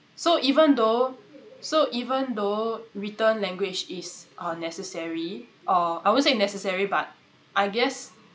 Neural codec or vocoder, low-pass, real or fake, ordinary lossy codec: none; none; real; none